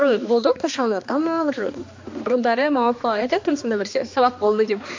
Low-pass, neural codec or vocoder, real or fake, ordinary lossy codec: 7.2 kHz; codec, 16 kHz, 2 kbps, X-Codec, HuBERT features, trained on balanced general audio; fake; MP3, 48 kbps